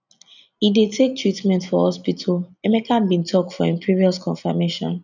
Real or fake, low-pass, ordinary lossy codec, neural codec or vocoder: real; 7.2 kHz; none; none